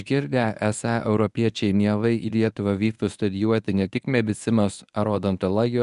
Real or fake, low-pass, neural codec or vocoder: fake; 10.8 kHz; codec, 24 kHz, 0.9 kbps, WavTokenizer, medium speech release version 1